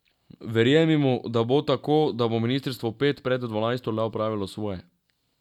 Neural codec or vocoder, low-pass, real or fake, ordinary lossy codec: none; 19.8 kHz; real; none